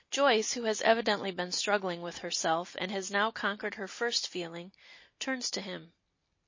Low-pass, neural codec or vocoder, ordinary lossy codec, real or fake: 7.2 kHz; none; MP3, 32 kbps; real